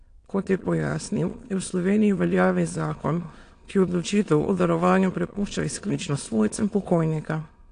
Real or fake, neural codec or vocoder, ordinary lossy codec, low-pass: fake; autoencoder, 22.05 kHz, a latent of 192 numbers a frame, VITS, trained on many speakers; AAC, 48 kbps; 9.9 kHz